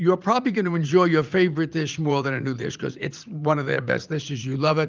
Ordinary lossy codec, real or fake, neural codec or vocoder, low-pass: Opus, 24 kbps; fake; vocoder, 44.1 kHz, 80 mel bands, Vocos; 7.2 kHz